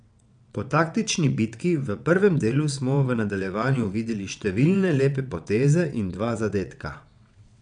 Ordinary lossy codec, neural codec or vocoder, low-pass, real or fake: none; vocoder, 22.05 kHz, 80 mel bands, WaveNeXt; 9.9 kHz; fake